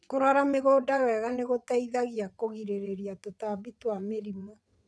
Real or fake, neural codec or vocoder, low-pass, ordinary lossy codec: fake; vocoder, 22.05 kHz, 80 mel bands, WaveNeXt; none; none